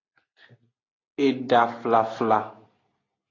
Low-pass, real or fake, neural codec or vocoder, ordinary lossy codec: 7.2 kHz; real; none; AAC, 32 kbps